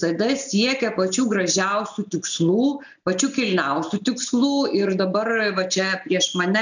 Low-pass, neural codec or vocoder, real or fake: 7.2 kHz; none; real